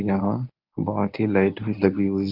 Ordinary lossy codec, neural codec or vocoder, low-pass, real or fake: none; codec, 24 kHz, 0.9 kbps, WavTokenizer, medium speech release version 1; 5.4 kHz; fake